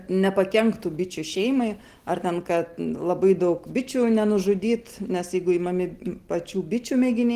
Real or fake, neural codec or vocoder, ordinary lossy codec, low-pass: real; none; Opus, 16 kbps; 14.4 kHz